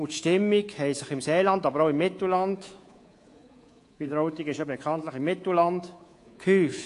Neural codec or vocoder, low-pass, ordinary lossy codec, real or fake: codec, 24 kHz, 3.1 kbps, DualCodec; 10.8 kHz; AAC, 48 kbps; fake